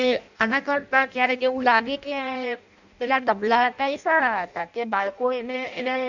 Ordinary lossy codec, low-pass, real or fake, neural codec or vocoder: none; 7.2 kHz; fake; codec, 16 kHz in and 24 kHz out, 0.6 kbps, FireRedTTS-2 codec